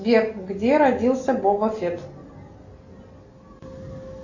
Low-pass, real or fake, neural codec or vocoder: 7.2 kHz; real; none